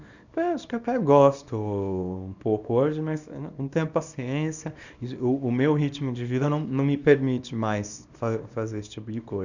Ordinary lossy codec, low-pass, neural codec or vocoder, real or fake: none; 7.2 kHz; codec, 24 kHz, 0.9 kbps, WavTokenizer, small release; fake